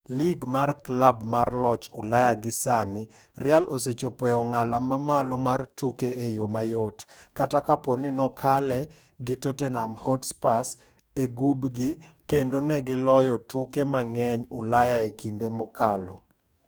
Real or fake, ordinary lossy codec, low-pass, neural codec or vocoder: fake; none; none; codec, 44.1 kHz, 2.6 kbps, DAC